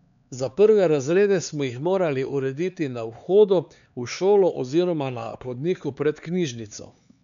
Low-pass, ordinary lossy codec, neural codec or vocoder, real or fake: 7.2 kHz; MP3, 96 kbps; codec, 16 kHz, 4 kbps, X-Codec, HuBERT features, trained on LibriSpeech; fake